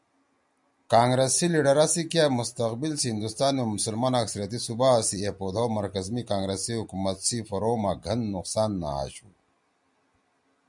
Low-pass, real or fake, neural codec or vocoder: 10.8 kHz; real; none